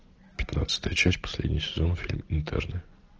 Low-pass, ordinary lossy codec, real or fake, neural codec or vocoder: 7.2 kHz; Opus, 16 kbps; real; none